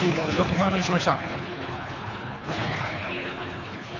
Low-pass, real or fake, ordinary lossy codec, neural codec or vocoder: 7.2 kHz; fake; none; codec, 24 kHz, 3 kbps, HILCodec